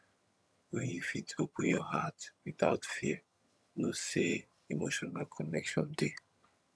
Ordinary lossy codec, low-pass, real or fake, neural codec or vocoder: none; none; fake; vocoder, 22.05 kHz, 80 mel bands, HiFi-GAN